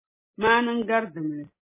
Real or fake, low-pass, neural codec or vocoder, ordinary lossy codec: real; 3.6 kHz; none; MP3, 16 kbps